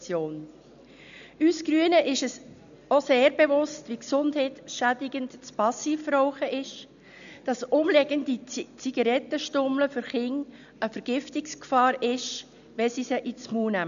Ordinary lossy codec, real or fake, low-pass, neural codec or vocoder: none; real; 7.2 kHz; none